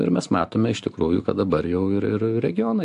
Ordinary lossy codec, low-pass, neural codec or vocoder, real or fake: AAC, 64 kbps; 10.8 kHz; none; real